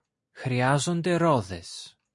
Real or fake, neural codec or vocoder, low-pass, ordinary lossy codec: real; none; 10.8 kHz; MP3, 48 kbps